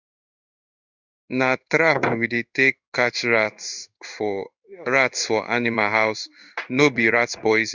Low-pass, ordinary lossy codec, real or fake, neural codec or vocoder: 7.2 kHz; Opus, 64 kbps; fake; codec, 16 kHz in and 24 kHz out, 1 kbps, XY-Tokenizer